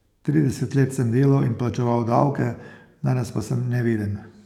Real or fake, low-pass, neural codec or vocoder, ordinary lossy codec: fake; 19.8 kHz; codec, 44.1 kHz, 7.8 kbps, DAC; none